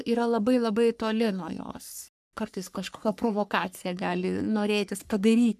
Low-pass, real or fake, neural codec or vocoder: 14.4 kHz; fake; codec, 44.1 kHz, 3.4 kbps, Pupu-Codec